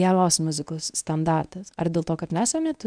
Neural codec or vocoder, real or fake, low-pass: codec, 24 kHz, 0.9 kbps, WavTokenizer, medium speech release version 1; fake; 9.9 kHz